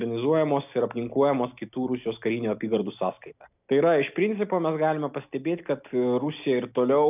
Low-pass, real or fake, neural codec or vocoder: 3.6 kHz; real; none